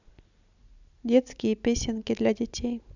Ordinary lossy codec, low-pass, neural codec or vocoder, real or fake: none; 7.2 kHz; none; real